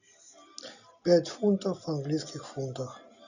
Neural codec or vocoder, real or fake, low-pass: none; real; 7.2 kHz